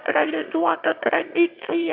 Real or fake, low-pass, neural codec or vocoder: fake; 5.4 kHz; autoencoder, 22.05 kHz, a latent of 192 numbers a frame, VITS, trained on one speaker